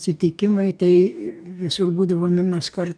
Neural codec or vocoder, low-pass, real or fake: codec, 44.1 kHz, 2.6 kbps, DAC; 9.9 kHz; fake